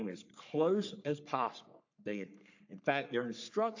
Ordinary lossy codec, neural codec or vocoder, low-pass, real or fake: AAC, 48 kbps; codec, 44.1 kHz, 3.4 kbps, Pupu-Codec; 7.2 kHz; fake